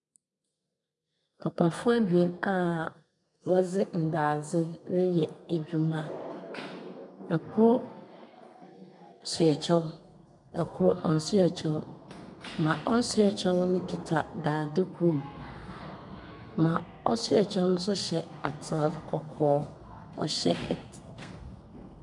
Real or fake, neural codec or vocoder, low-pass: fake; codec, 32 kHz, 1.9 kbps, SNAC; 10.8 kHz